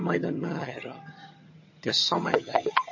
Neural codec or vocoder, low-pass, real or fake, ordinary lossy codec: vocoder, 22.05 kHz, 80 mel bands, HiFi-GAN; 7.2 kHz; fake; MP3, 32 kbps